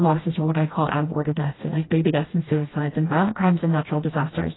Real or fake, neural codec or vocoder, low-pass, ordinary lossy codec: fake; codec, 16 kHz, 1 kbps, FreqCodec, smaller model; 7.2 kHz; AAC, 16 kbps